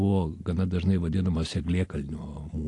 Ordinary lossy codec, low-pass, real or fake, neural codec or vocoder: AAC, 48 kbps; 10.8 kHz; real; none